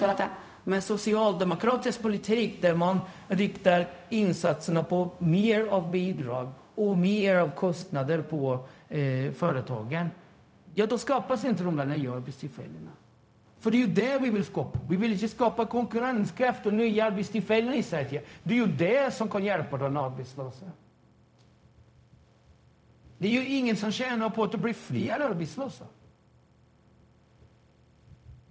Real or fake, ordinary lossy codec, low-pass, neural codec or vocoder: fake; none; none; codec, 16 kHz, 0.4 kbps, LongCat-Audio-Codec